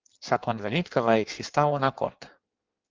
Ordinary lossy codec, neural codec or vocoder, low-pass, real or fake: Opus, 24 kbps; codec, 16 kHz in and 24 kHz out, 1.1 kbps, FireRedTTS-2 codec; 7.2 kHz; fake